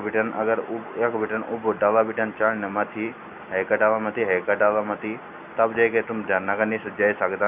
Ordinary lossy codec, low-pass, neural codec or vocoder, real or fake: none; 3.6 kHz; none; real